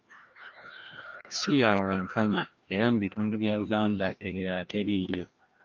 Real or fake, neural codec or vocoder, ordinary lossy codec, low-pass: fake; codec, 16 kHz, 1 kbps, FreqCodec, larger model; Opus, 24 kbps; 7.2 kHz